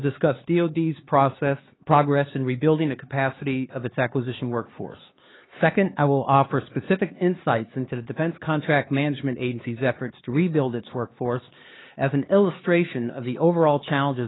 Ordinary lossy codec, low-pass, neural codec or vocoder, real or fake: AAC, 16 kbps; 7.2 kHz; codec, 16 kHz, 4 kbps, X-Codec, HuBERT features, trained on LibriSpeech; fake